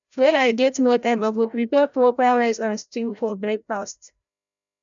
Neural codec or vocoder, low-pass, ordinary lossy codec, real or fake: codec, 16 kHz, 0.5 kbps, FreqCodec, larger model; 7.2 kHz; none; fake